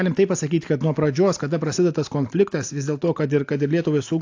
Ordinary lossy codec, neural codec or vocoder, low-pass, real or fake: AAC, 48 kbps; none; 7.2 kHz; real